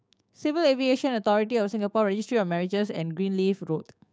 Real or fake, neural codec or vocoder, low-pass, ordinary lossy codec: fake; codec, 16 kHz, 6 kbps, DAC; none; none